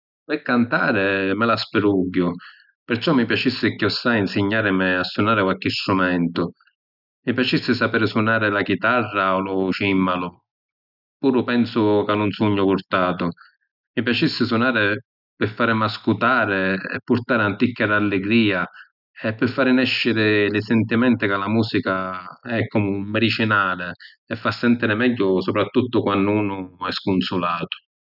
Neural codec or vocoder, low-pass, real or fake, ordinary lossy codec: none; 5.4 kHz; real; none